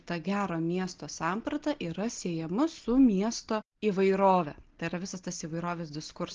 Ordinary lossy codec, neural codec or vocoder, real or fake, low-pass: Opus, 16 kbps; none; real; 7.2 kHz